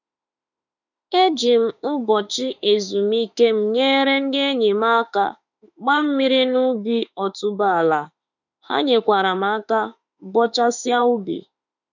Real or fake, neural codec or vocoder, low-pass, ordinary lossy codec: fake; autoencoder, 48 kHz, 32 numbers a frame, DAC-VAE, trained on Japanese speech; 7.2 kHz; none